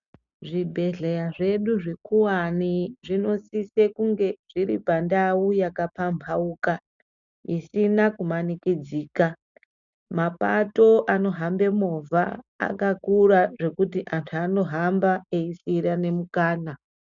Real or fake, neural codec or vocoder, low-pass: real; none; 7.2 kHz